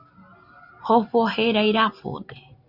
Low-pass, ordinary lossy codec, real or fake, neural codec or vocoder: 5.4 kHz; Opus, 64 kbps; real; none